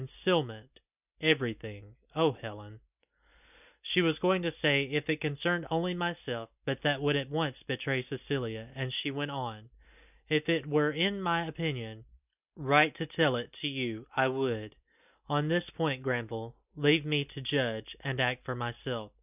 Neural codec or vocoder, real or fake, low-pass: none; real; 3.6 kHz